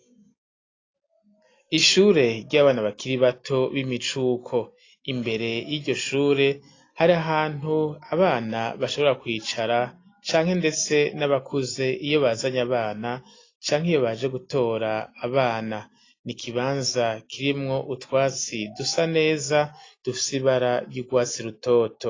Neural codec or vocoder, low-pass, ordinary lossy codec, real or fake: none; 7.2 kHz; AAC, 32 kbps; real